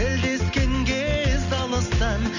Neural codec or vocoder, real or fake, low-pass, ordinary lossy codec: none; real; 7.2 kHz; none